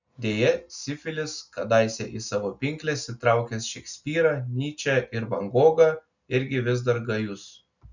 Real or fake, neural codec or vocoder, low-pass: real; none; 7.2 kHz